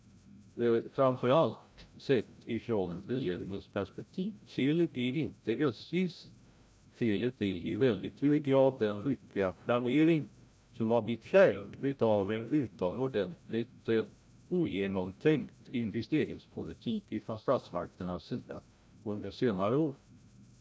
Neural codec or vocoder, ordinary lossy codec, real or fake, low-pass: codec, 16 kHz, 0.5 kbps, FreqCodec, larger model; none; fake; none